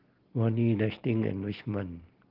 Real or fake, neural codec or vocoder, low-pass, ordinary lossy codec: real; none; 5.4 kHz; Opus, 16 kbps